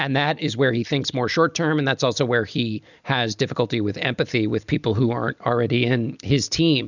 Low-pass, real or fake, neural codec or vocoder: 7.2 kHz; real; none